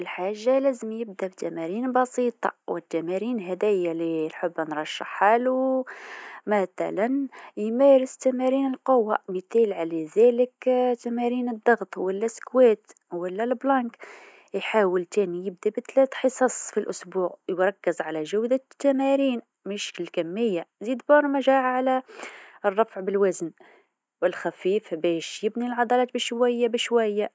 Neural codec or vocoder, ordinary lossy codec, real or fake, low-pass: none; none; real; none